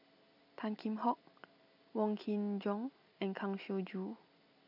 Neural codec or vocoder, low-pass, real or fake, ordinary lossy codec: none; 5.4 kHz; real; none